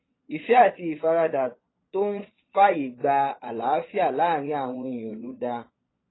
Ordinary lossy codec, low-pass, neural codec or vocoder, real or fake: AAC, 16 kbps; 7.2 kHz; vocoder, 22.05 kHz, 80 mel bands, Vocos; fake